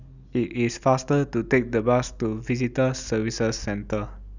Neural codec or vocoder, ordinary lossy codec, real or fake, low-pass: none; none; real; 7.2 kHz